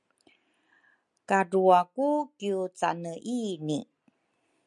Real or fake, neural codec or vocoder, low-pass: real; none; 10.8 kHz